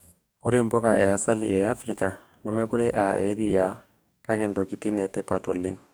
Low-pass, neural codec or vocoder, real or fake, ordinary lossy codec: none; codec, 44.1 kHz, 2.6 kbps, DAC; fake; none